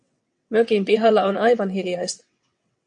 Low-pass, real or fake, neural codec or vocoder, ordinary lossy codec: 9.9 kHz; fake; vocoder, 22.05 kHz, 80 mel bands, WaveNeXt; MP3, 64 kbps